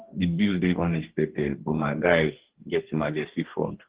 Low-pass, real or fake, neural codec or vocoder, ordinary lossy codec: 3.6 kHz; fake; codec, 44.1 kHz, 2.6 kbps, DAC; Opus, 16 kbps